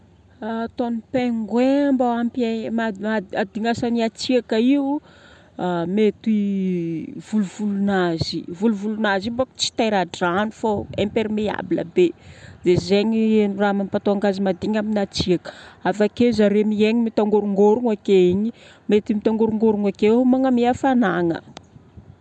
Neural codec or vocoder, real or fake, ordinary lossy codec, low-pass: none; real; none; none